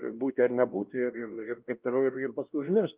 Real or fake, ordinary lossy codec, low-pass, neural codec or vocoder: fake; Opus, 32 kbps; 3.6 kHz; codec, 16 kHz, 1 kbps, X-Codec, WavLM features, trained on Multilingual LibriSpeech